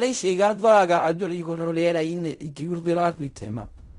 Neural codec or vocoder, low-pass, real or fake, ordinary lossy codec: codec, 16 kHz in and 24 kHz out, 0.4 kbps, LongCat-Audio-Codec, fine tuned four codebook decoder; 10.8 kHz; fake; Opus, 64 kbps